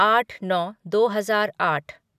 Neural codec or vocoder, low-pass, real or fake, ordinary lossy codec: none; 14.4 kHz; real; none